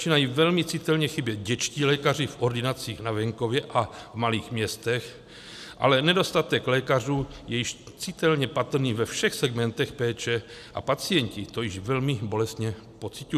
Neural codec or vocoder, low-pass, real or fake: none; 14.4 kHz; real